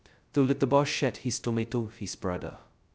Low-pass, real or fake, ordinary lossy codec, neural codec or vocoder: none; fake; none; codec, 16 kHz, 0.2 kbps, FocalCodec